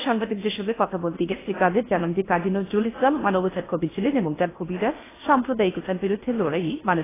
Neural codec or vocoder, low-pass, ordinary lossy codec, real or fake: codec, 16 kHz in and 24 kHz out, 0.6 kbps, FocalCodec, streaming, 4096 codes; 3.6 kHz; AAC, 16 kbps; fake